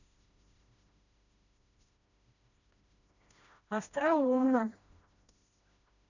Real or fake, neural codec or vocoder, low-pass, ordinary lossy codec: fake; codec, 16 kHz, 1 kbps, FreqCodec, smaller model; 7.2 kHz; none